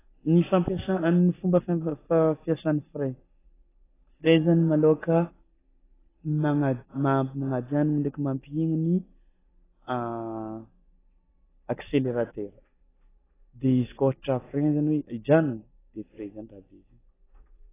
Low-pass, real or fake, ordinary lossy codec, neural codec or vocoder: 3.6 kHz; real; AAC, 16 kbps; none